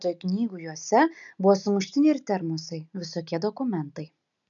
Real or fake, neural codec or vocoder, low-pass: real; none; 7.2 kHz